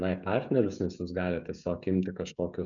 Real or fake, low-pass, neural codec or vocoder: fake; 7.2 kHz; codec, 16 kHz, 16 kbps, FreqCodec, smaller model